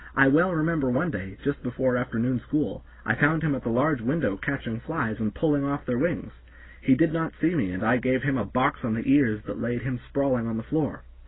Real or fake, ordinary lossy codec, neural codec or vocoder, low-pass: real; AAC, 16 kbps; none; 7.2 kHz